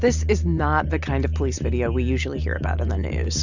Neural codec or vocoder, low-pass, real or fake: none; 7.2 kHz; real